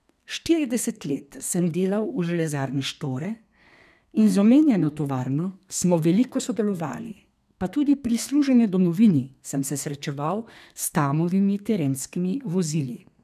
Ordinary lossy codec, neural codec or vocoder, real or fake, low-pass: none; codec, 32 kHz, 1.9 kbps, SNAC; fake; 14.4 kHz